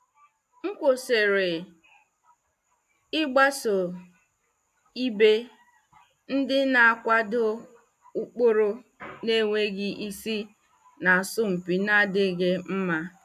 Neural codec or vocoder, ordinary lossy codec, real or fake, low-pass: none; AAC, 96 kbps; real; 14.4 kHz